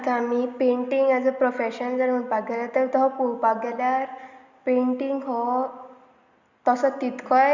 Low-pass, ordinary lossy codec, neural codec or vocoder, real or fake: 7.2 kHz; Opus, 64 kbps; none; real